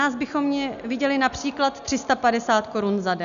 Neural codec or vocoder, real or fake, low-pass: none; real; 7.2 kHz